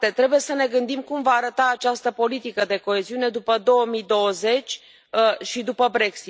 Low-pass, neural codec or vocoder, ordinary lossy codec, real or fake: none; none; none; real